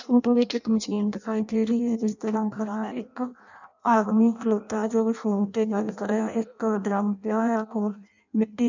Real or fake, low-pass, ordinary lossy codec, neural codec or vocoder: fake; 7.2 kHz; none; codec, 16 kHz in and 24 kHz out, 0.6 kbps, FireRedTTS-2 codec